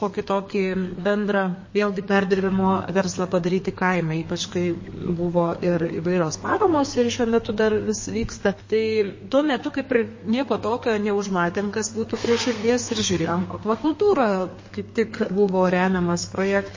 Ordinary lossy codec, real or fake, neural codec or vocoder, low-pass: MP3, 32 kbps; fake; codec, 32 kHz, 1.9 kbps, SNAC; 7.2 kHz